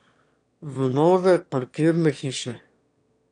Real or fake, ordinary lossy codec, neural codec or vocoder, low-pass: fake; none; autoencoder, 22.05 kHz, a latent of 192 numbers a frame, VITS, trained on one speaker; 9.9 kHz